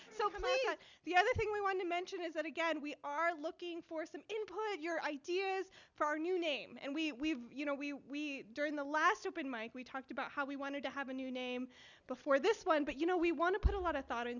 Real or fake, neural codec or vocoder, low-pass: real; none; 7.2 kHz